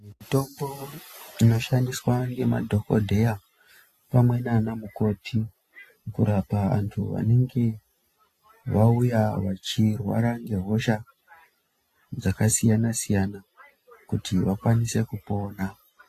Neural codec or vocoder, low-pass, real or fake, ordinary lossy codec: none; 14.4 kHz; real; AAC, 48 kbps